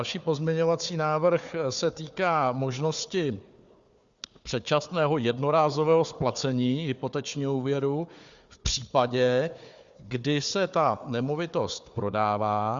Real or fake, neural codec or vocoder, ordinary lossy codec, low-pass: fake; codec, 16 kHz, 4 kbps, FunCodec, trained on Chinese and English, 50 frames a second; Opus, 64 kbps; 7.2 kHz